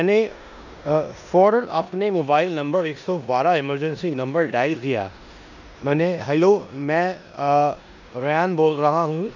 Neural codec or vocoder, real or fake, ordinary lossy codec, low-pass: codec, 16 kHz in and 24 kHz out, 0.9 kbps, LongCat-Audio-Codec, four codebook decoder; fake; none; 7.2 kHz